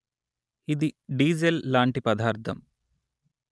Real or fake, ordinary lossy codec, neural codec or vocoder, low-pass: real; none; none; none